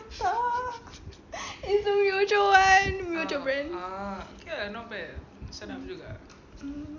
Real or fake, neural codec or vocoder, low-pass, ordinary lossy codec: real; none; 7.2 kHz; none